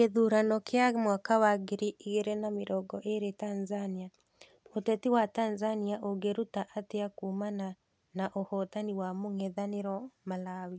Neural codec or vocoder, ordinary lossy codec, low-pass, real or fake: none; none; none; real